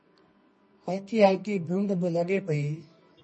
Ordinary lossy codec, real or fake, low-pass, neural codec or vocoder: MP3, 32 kbps; fake; 10.8 kHz; codec, 24 kHz, 0.9 kbps, WavTokenizer, medium music audio release